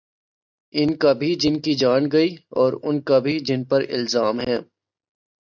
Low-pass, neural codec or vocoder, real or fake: 7.2 kHz; none; real